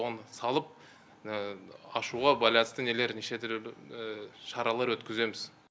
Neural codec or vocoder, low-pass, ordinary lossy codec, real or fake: none; none; none; real